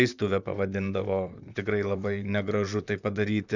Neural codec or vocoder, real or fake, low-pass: vocoder, 44.1 kHz, 128 mel bands, Pupu-Vocoder; fake; 7.2 kHz